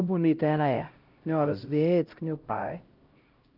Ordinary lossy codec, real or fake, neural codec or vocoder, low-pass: Opus, 32 kbps; fake; codec, 16 kHz, 0.5 kbps, X-Codec, HuBERT features, trained on LibriSpeech; 5.4 kHz